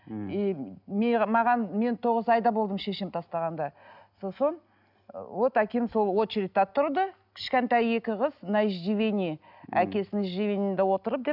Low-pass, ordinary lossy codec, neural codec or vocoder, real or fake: 5.4 kHz; none; none; real